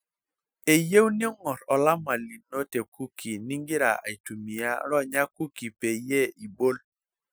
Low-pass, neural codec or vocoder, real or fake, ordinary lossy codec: none; none; real; none